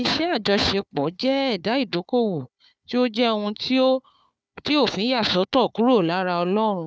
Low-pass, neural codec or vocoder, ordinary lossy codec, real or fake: none; codec, 16 kHz, 4 kbps, FunCodec, trained on Chinese and English, 50 frames a second; none; fake